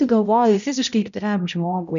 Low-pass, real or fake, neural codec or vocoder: 7.2 kHz; fake; codec, 16 kHz, 0.5 kbps, X-Codec, HuBERT features, trained on balanced general audio